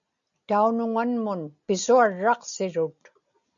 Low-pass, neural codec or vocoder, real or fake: 7.2 kHz; none; real